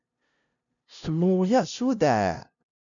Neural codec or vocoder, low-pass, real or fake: codec, 16 kHz, 0.5 kbps, FunCodec, trained on LibriTTS, 25 frames a second; 7.2 kHz; fake